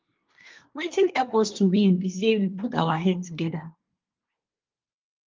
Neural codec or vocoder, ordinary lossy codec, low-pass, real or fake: codec, 24 kHz, 1 kbps, SNAC; Opus, 32 kbps; 7.2 kHz; fake